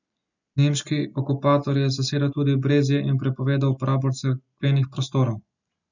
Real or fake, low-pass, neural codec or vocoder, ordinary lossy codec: real; 7.2 kHz; none; none